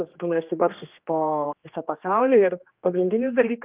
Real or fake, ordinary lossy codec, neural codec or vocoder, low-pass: fake; Opus, 24 kbps; codec, 16 kHz, 2 kbps, X-Codec, HuBERT features, trained on general audio; 3.6 kHz